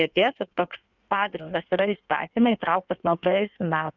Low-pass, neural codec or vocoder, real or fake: 7.2 kHz; codec, 16 kHz in and 24 kHz out, 1.1 kbps, FireRedTTS-2 codec; fake